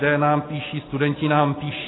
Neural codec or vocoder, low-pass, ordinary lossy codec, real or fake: none; 7.2 kHz; AAC, 16 kbps; real